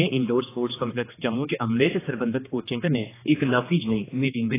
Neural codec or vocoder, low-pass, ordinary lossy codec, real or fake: codec, 16 kHz, 2 kbps, X-Codec, HuBERT features, trained on general audio; 3.6 kHz; AAC, 16 kbps; fake